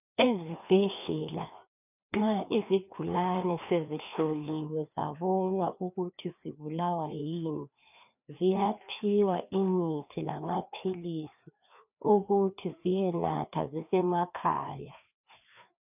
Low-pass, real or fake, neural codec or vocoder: 3.6 kHz; fake; codec, 16 kHz, 2 kbps, FreqCodec, larger model